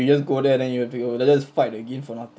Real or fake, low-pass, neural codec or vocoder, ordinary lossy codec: real; none; none; none